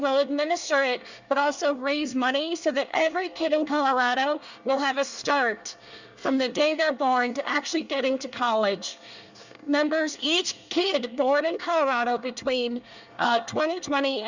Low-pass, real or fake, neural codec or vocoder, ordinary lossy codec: 7.2 kHz; fake; codec, 24 kHz, 1 kbps, SNAC; Opus, 64 kbps